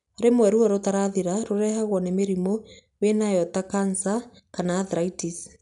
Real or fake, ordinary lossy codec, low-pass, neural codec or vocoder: real; none; 10.8 kHz; none